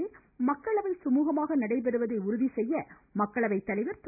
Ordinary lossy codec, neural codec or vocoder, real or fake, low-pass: none; none; real; 3.6 kHz